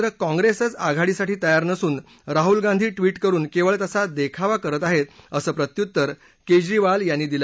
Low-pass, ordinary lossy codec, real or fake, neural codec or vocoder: none; none; real; none